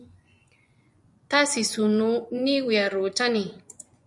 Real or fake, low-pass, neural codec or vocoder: fake; 10.8 kHz; vocoder, 24 kHz, 100 mel bands, Vocos